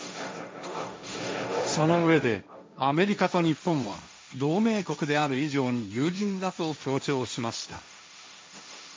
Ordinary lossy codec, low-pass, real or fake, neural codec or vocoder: none; none; fake; codec, 16 kHz, 1.1 kbps, Voila-Tokenizer